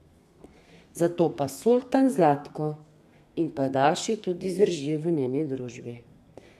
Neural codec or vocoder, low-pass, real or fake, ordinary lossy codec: codec, 32 kHz, 1.9 kbps, SNAC; 14.4 kHz; fake; none